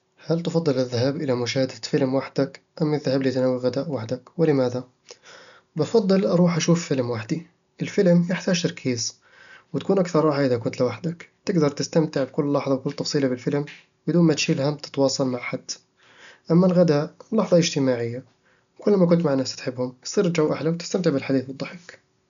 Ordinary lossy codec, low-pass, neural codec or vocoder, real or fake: none; 7.2 kHz; none; real